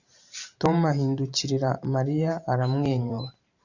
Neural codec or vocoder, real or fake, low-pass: vocoder, 44.1 kHz, 128 mel bands every 512 samples, BigVGAN v2; fake; 7.2 kHz